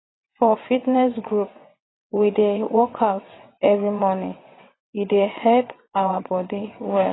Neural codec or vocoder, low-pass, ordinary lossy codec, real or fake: vocoder, 22.05 kHz, 80 mel bands, WaveNeXt; 7.2 kHz; AAC, 16 kbps; fake